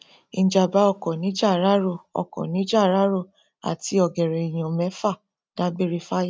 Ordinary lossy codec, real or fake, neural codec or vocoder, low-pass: none; real; none; none